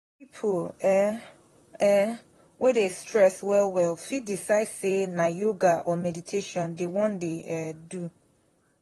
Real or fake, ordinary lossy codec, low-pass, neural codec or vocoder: fake; AAC, 32 kbps; 19.8 kHz; vocoder, 44.1 kHz, 128 mel bands, Pupu-Vocoder